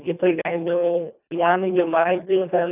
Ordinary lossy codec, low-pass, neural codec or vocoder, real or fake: none; 3.6 kHz; codec, 24 kHz, 1.5 kbps, HILCodec; fake